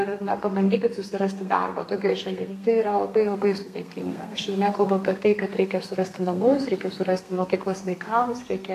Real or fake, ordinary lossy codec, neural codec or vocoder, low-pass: fake; AAC, 64 kbps; codec, 32 kHz, 1.9 kbps, SNAC; 14.4 kHz